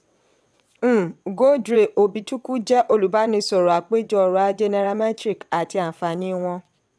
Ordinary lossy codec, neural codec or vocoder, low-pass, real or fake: none; vocoder, 22.05 kHz, 80 mel bands, WaveNeXt; none; fake